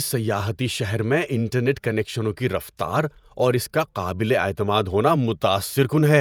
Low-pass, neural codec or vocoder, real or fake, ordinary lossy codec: none; vocoder, 48 kHz, 128 mel bands, Vocos; fake; none